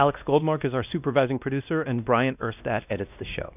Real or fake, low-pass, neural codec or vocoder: fake; 3.6 kHz; codec, 16 kHz, 1 kbps, X-Codec, WavLM features, trained on Multilingual LibriSpeech